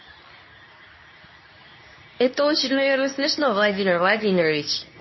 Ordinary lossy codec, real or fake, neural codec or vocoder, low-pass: MP3, 24 kbps; fake; codec, 24 kHz, 0.9 kbps, WavTokenizer, medium speech release version 2; 7.2 kHz